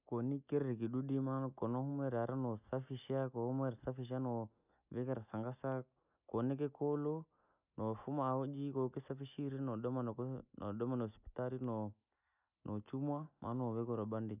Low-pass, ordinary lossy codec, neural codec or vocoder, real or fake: 3.6 kHz; none; none; real